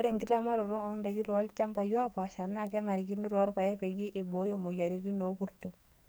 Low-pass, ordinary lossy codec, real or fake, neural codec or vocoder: none; none; fake; codec, 44.1 kHz, 2.6 kbps, SNAC